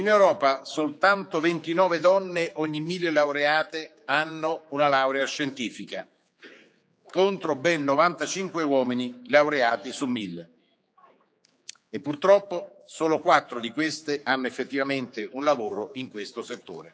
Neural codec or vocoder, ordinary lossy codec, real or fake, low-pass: codec, 16 kHz, 4 kbps, X-Codec, HuBERT features, trained on general audio; none; fake; none